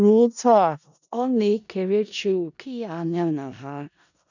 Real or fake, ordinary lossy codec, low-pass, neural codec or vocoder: fake; none; 7.2 kHz; codec, 16 kHz in and 24 kHz out, 0.4 kbps, LongCat-Audio-Codec, four codebook decoder